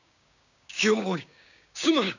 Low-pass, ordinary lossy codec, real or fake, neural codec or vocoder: 7.2 kHz; none; fake; codec, 16 kHz, 6 kbps, DAC